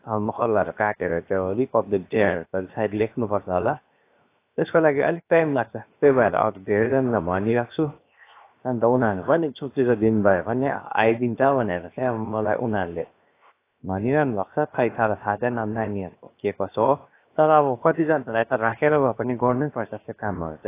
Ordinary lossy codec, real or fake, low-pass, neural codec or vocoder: AAC, 24 kbps; fake; 3.6 kHz; codec, 16 kHz, 0.7 kbps, FocalCodec